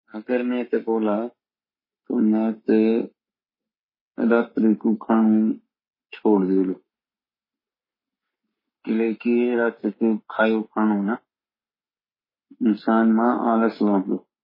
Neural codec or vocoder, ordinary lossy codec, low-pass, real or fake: codec, 44.1 kHz, 7.8 kbps, Pupu-Codec; MP3, 24 kbps; 5.4 kHz; fake